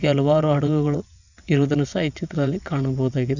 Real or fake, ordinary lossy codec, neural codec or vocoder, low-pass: fake; none; vocoder, 22.05 kHz, 80 mel bands, WaveNeXt; 7.2 kHz